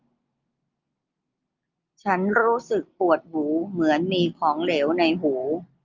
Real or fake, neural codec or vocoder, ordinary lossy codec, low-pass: real; none; Opus, 32 kbps; 7.2 kHz